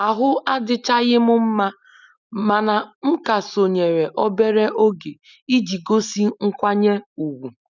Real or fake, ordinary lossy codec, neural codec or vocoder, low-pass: real; none; none; 7.2 kHz